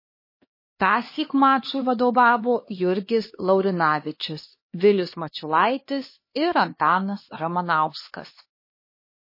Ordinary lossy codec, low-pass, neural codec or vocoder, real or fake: MP3, 24 kbps; 5.4 kHz; codec, 16 kHz, 2 kbps, X-Codec, HuBERT features, trained on LibriSpeech; fake